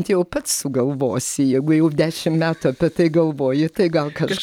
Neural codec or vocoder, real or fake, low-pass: none; real; 19.8 kHz